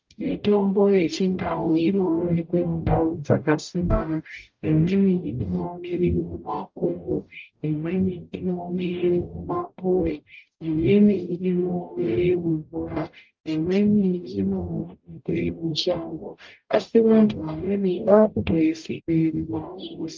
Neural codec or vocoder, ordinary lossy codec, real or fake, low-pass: codec, 44.1 kHz, 0.9 kbps, DAC; Opus, 32 kbps; fake; 7.2 kHz